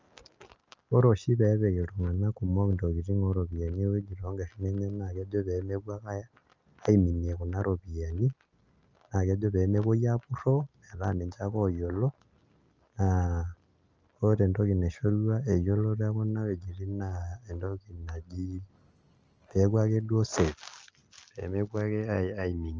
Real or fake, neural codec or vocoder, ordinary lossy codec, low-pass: real; none; Opus, 24 kbps; 7.2 kHz